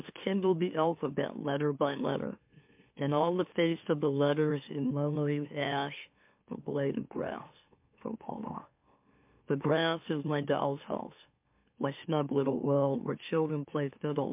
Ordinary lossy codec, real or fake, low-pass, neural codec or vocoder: MP3, 32 kbps; fake; 3.6 kHz; autoencoder, 44.1 kHz, a latent of 192 numbers a frame, MeloTTS